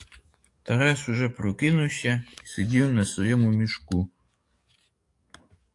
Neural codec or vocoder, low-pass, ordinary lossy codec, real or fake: codec, 44.1 kHz, 7.8 kbps, DAC; 10.8 kHz; AAC, 64 kbps; fake